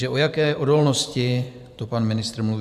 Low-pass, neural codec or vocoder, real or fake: 14.4 kHz; vocoder, 44.1 kHz, 128 mel bands every 512 samples, BigVGAN v2; fake